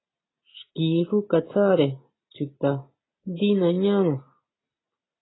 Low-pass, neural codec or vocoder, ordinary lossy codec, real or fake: 7.2 kHz; none; AAC, 16 kbps; real